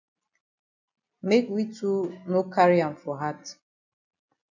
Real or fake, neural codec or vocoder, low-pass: real; none; 7.2 kHz